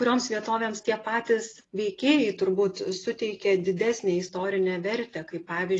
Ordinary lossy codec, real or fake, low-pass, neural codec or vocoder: AAC, 32 kbps; real; 10.8 kHz; none